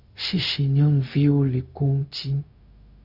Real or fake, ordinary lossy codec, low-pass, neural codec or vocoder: fake; Opus, 64 kbps; 5.4 kHz; codec, 16 kHz, 0.4 kbps, LongCat-Audio-Codec